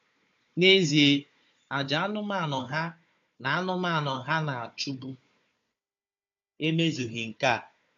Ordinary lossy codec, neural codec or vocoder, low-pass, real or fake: AAC, 64 kbps; codec, 16 kHz, 4 kbps, FunCodec, trained on Chinese and English, 50 frames a second; 7.2 kHz; fake